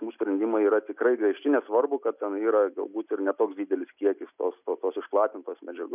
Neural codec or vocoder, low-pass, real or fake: none; 3.6 kHz; real